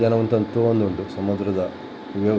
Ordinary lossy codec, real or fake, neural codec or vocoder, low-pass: none; real; none; none